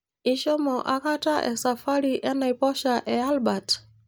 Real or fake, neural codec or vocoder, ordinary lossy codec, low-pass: real; none; none; none